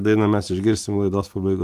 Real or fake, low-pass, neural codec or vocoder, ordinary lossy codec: real; 14.4 kHz; none; Opus, 24 kbps